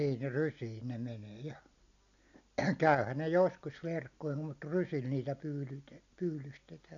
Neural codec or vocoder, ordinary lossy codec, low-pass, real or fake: none; none; 7.2 kHz; real